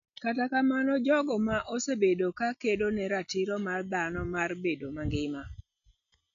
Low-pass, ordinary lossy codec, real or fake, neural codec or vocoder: 7.2 kHz; none; real; none